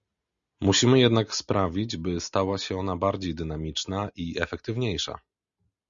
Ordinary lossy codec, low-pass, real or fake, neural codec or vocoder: Opus, 64 kbps; 7.2 kHz; real; none